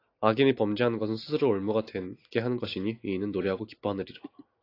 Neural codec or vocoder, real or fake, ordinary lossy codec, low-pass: none; real; AAC, 32 kbps; 5.4 kHz